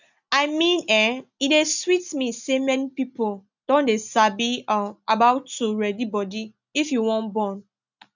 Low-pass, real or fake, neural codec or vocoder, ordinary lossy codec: 7.2 kHz; real; none; none